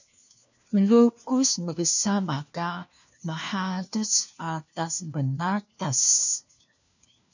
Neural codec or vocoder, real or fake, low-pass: codec, 16 kHz, 1 kbps, FunCodec, trained on LibriTTS, 50 frames a second; fake; 7.2 kHz